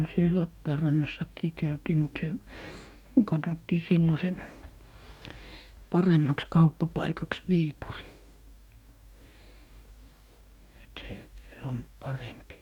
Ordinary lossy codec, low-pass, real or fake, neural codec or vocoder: none; 19.8 kHz; fake; codec, 44.1 kHz, 2.6 kbps, DAC